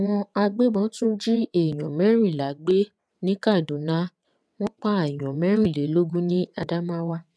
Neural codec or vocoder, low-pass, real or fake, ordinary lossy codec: vocoder, 22.05 kHz, 80 mel bands, WaveNeXt; none; fake; none